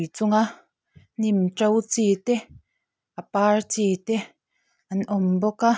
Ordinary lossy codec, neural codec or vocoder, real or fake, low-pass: none; none; real; none